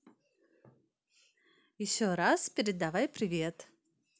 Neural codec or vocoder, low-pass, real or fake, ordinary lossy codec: none; none; real; none